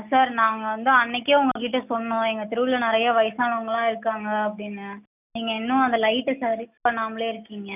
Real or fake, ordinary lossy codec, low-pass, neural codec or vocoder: real; none; 3.6 kHz; none